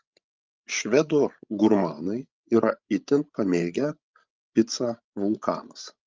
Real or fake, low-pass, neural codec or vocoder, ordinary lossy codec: fake; 7.2 kHz; codec, 16 kHz, 16 kbps, FreqCodec, larger model; Opus, 24 kbps